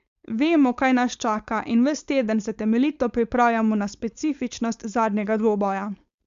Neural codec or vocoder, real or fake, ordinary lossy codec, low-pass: codec, 16 kHz, 4.8 kbps, FACodec; fake; Opus, 64 kbps; 7.2 kHz